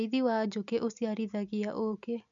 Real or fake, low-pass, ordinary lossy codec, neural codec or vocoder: real; 7.2 kHz; MP3, 96 kbps; none